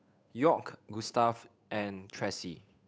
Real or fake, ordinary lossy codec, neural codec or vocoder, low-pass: fake; none; codec, 16 kHz, 8 kbps, FunCodec, trained on Chinese and English, 25 frames a second; none